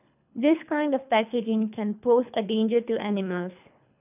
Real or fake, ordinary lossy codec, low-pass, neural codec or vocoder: fake; none; 3.6 kHz; codec, 24 kHz, 3 kbps, HILCodec